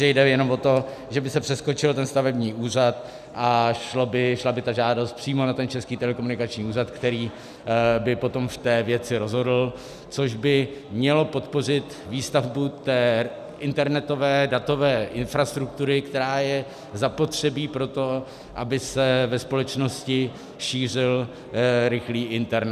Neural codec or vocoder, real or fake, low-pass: none; real; 14.4 kHz